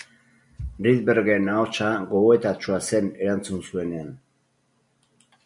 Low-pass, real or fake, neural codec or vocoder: 10.8 kHz; real; none